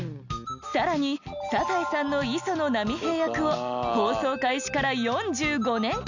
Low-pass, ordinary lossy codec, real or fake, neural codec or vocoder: 7.2 kHz; none; real; none